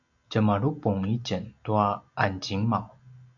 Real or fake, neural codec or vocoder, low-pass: real; none; 7.2 kHz